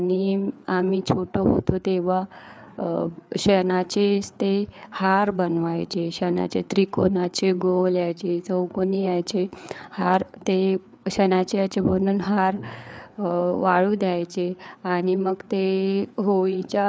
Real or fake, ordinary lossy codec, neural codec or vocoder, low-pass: fake; none; codec, 16 kHz, 4 kbps, FreqCodec, larger model; none